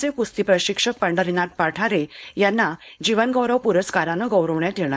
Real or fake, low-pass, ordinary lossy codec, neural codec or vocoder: fake; none; none; codec, 16 kHz, 4.8 kbps, FACodec